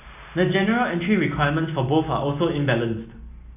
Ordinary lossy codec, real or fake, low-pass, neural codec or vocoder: none; real; 3.6 kHz; none